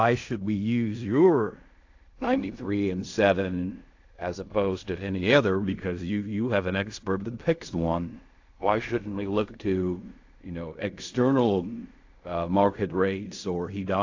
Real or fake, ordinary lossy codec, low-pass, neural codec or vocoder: fake; AAC, 48 kbps; 7.2 kHz; codec, 16 kHz in and 24 kHz out, 0.4 kbps, LongCat-Audio-Codec, fine tuned four codebook decoder